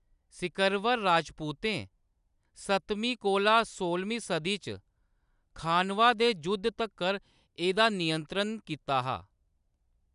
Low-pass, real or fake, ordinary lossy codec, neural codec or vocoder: 10.8 kHz; real; none; none